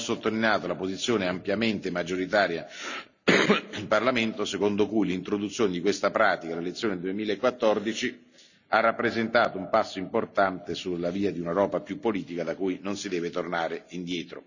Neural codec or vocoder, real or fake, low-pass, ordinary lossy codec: none; real; 7.2 kHz; none